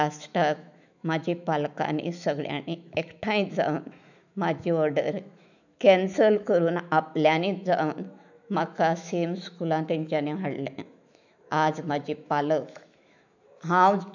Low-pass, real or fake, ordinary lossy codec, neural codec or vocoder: 7.2 kHz; fake; none; autoencoder, 48 kHz, 128 numbers a frame, DAC-VAE, trained on Japanese speech